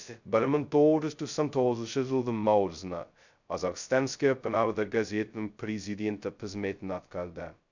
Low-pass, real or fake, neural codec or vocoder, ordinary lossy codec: 7.2 kHz; fake; codec, 16 kHz, 0.2 kbps, FocalCodec; none